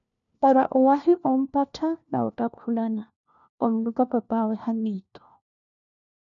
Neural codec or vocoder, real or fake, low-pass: codec, 16 kHz, 1 kbps, FunCodec, trained on LibriTTS, 50 frames a second; fake; 7.2 kHz